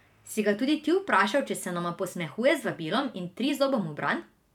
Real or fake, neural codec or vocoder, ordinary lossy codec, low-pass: fake; vocoder, 44.1 kHz, 128 mel bands every 512 samples, BigVGAN v2; none; 19.8 kHz